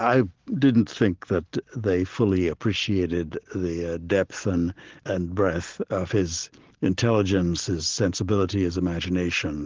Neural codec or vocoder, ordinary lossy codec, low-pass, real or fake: none; Opus, 16 kbps; 7.2 kHz; real